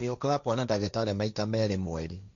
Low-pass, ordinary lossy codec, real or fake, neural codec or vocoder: 7.2 kHz; none; fake; codec, 16 kHz, 1.1 kbps, Voila-Tokenizer